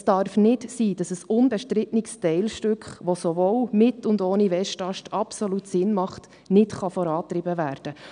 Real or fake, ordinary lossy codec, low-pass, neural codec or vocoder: real; none; 9.9 kHz; none